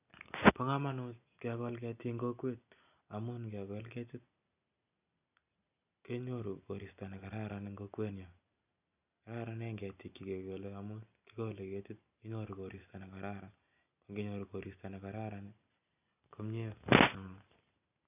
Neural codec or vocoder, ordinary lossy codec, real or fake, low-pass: none; none; real; 3.6 kHz